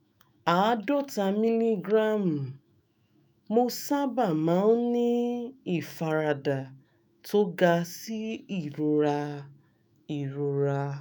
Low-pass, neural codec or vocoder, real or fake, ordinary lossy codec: none; autoencoder, 48 kHz, 128 numbers a frame, DAC-VAE, trained on Japanese speech; fake; none